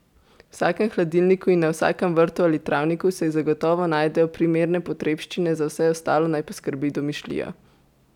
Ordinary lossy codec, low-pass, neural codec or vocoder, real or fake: none; 19.8 kHz; none; real